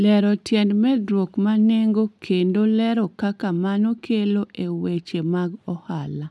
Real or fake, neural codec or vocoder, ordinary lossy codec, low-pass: real; none; none; none